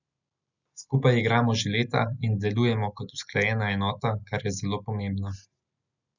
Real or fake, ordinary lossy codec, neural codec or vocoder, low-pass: real; none; none; 7.2 kHz